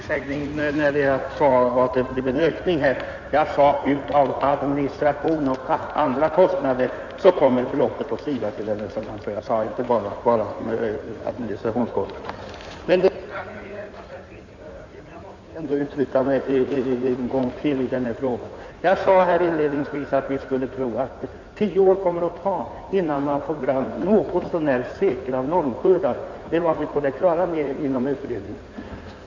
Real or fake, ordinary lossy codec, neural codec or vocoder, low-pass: fake; none; codec, 16 kHz in and 24 kHz out, 2.2 kbps, FireRedTTS-2 codec; 7.2 kHz